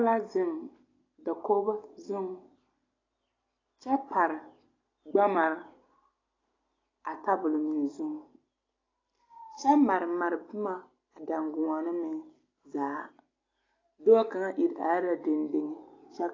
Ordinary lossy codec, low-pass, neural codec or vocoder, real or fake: AAC, 32 kbps; 7.2 kHz; none; real